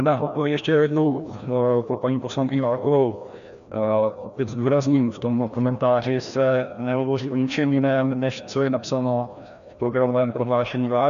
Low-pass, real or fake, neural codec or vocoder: 7.2 kHz; fake; codec, 16 kHz, 1 kbps, FreqCodec, larger model